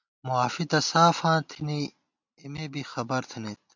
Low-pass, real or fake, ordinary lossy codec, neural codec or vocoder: 7.2 kHz; real; MP3, 64 kbps; none